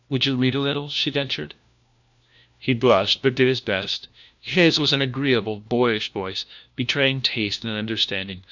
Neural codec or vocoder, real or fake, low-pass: codec, 16 kHz, 1 kbps, FunCodec, trained on LibriTTS, 50 frames a second; fake; 7.2 kHz